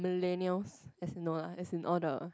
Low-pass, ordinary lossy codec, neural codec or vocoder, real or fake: none; none; none; real